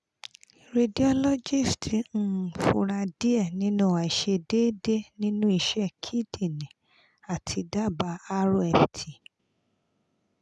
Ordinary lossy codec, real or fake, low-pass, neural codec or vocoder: none; real; none; none